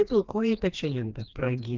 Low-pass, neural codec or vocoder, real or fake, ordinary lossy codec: 7.2 kHz; codec, 16 kHz, 2 kbps, FreqCodec, smaller model; fake; Opus, 24 kbps